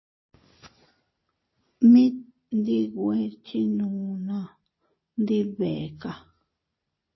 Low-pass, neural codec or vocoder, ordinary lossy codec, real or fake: 7.2 kHz; none; MP3, 24 kbps; real